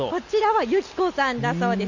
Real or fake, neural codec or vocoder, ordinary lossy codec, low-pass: real; none; none; 7.2 kHz